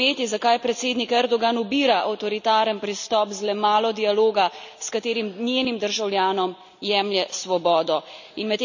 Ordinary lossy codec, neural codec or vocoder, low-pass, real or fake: none; none; 7.2 kHz; real